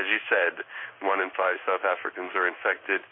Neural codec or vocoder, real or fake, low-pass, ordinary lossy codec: codec, 16 kHz in and 24 kHz out, 1 kbps, XY-Tokenizer; fake; 5.4 kHz; MP3, 24 kbps